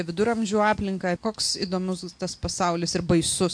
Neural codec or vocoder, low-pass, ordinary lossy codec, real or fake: none; 9.9 kHz; MP3, 64 kbps; real